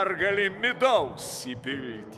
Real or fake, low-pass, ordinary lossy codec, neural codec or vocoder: fake; 14.4 kHz; MP3, 96 kbps; autoencoder, 48 kHz, 128 numbers a frame, DAC-VAE, trained on Japanese speech